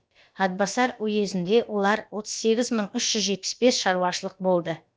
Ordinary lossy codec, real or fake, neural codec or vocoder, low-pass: none; fake; codec, 16 kHz, about 1 kbps, DyCAST, with the encoder's durations; none